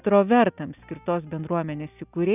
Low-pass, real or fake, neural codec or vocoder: 3.6 kHz; real; none